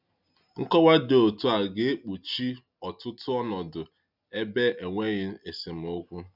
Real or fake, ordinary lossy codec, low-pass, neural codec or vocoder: real; none; 5.4 kHz; none